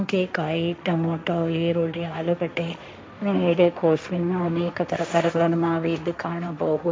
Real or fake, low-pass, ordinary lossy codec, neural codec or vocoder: fake; none; none; codec, 16 kHz, 1.1 kbps, Voila-Tokenizer